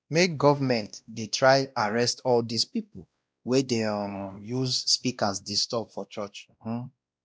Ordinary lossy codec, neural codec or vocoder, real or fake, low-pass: none; codec, 16 kHz, 1 kbps, X-Codec, WavLM features, trained on Multilingual LibriSpeech; fake; none